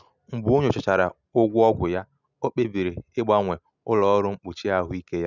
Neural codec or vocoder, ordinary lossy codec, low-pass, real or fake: none; none; 7.2 kHz; real